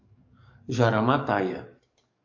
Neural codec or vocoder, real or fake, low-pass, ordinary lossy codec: codec, 44.1 kHz, 7.8 kbps, DAC; fake; 7.2 kHz; AAC, 48 kbps